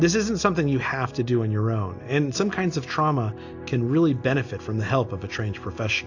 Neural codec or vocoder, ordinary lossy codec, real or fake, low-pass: none; AAC, 48 kbps; real; 7.2 kHz